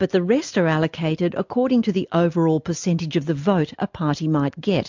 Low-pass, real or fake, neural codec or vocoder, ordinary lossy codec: 7.2 kHz; real; none; MP3, 64 kbps